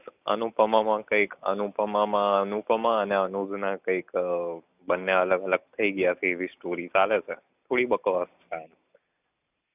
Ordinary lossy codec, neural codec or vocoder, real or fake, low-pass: none; none; real; 3.6 kHz